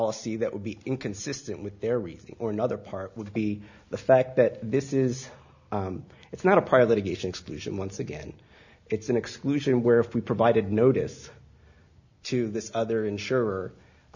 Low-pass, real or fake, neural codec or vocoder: 7.2 kHz; real; none